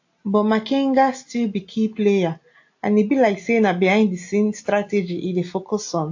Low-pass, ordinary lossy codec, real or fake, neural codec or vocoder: 7.2 kHz; AAC, 48 kbps; real; none